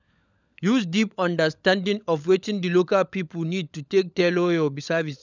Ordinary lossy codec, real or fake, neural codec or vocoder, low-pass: none; fake; codec, 16 kHz, 16 kbps, FunCodec, trained on LibriTTS, 50 frames a second; 7.2 kHz